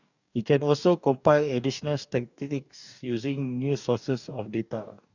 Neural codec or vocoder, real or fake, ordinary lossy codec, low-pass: codec, 44.1 kHz, 2.6 kbps, DAC; fake; none; 7.2 kHz